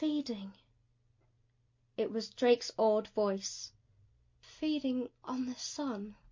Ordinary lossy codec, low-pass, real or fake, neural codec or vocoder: MP3, 48 kbps; 7.2 kHz; real; none